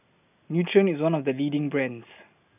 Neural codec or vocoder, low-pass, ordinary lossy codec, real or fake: none; 3.6 kHz; none; real